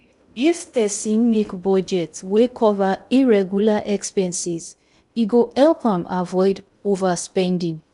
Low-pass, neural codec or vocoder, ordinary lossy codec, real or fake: 10.8 kHz; codec, 16 kHz in and 24 kHz out, 0.6 kbps, FocalCodec, streaming, 2048 codes; none; fake